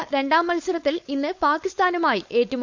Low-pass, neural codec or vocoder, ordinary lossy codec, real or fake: 7.2 kHz; codec, 16 kHz, 4.8 kbps, FACodec; Opus, 64 kbps; fake